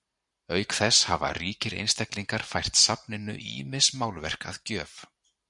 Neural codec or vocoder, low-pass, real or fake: vocoder, 44.1 kHz, 128 mel bands every 512 samples, BigVGAN v2; 10.8 kHz; fake